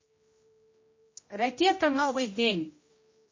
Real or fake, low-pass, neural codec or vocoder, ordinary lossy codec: fake; 7.2 kHz; codec, 16 kHz, 0.5 kbps, X-Codec, HuBERT features, trained on general audio; MP3, 32 kbps